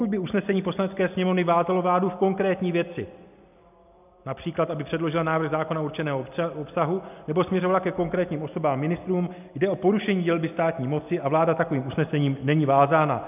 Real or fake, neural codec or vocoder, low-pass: real; none; 3.6 kHz